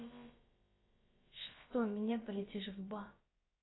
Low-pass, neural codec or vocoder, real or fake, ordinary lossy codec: 7.2 kHz; codec, 16 kHz, about 1 kbps, DyCAST, with the encoder's durations; fake; AAC, 16 kbps